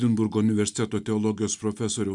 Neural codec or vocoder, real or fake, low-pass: none; real; 10.8 kHz